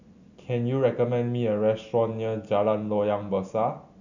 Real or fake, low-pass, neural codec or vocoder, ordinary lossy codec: real; 7.2 kHz; none; none